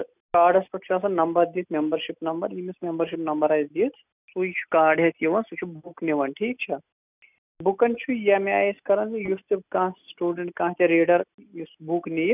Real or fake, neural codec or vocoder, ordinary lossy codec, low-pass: real; none; none; 3.6 kHz